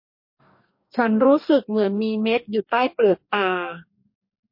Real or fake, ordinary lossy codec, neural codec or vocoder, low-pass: fake; MP3, 32 kbps; codec, 44.1 kHz, 2.6 kbps, DAC; 5.4 kHz